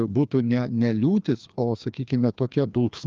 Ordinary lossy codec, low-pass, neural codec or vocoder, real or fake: Opus, 32 kbps; 7.2 kHz; codec, 16 kHz, 2 kbps, FreqCodec, larger model; fake